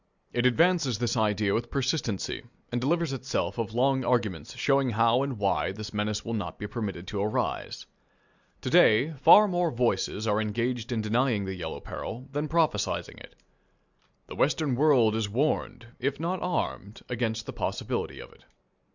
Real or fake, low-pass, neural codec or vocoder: real; 7.2 kHz; none